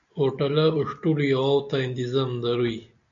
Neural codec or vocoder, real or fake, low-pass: none; real; 7.2 kHz